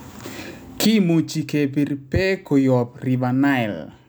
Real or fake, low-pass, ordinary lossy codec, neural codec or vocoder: real; none; none; none